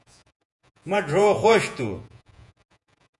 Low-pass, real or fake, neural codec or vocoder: 10.8 kHz; fake; vocoder, 48 kHz, 128 mel bands, Vocos